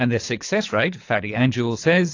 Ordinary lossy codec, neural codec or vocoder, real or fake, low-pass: AAC, 48 kbps; codec, 24 kHz, 3 kbps, HILCodec; fake; 7.2 kHz